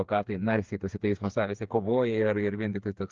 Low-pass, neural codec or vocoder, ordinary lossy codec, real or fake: 7.2 kHz; codec, 16 kHz, 4 kbps, FreqCodec, smaller model; Opus, 24 kbps; fake